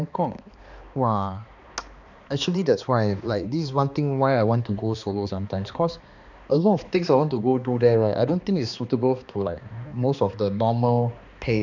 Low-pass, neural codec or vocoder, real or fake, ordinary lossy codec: 7.2 kHz; codec, 16 kHz, 2 kbps, X-Codec, HuBERT features, trained on balanced general audio; fake; none